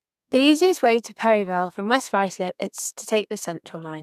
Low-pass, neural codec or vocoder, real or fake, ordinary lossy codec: 14.4 kHz; codec, 32 kHz, 1.9 kbps, SNAC; fake; none